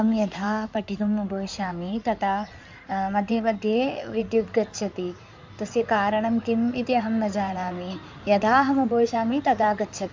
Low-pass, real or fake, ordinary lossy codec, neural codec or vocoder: 7.2 kHz; fake; MP3, 64 kbps; codec, 16 kHz in and 24 kHz out, 2.2 kbps, FireRedTTS-2 codec